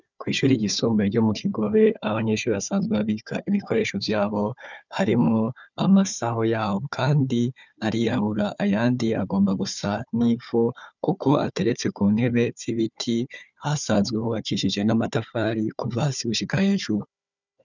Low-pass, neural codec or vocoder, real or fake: 7.2 kHz; codec, 16 kHz, 4 kbps, FunCodec, trained on Chinese and English, 50 frames a second; fake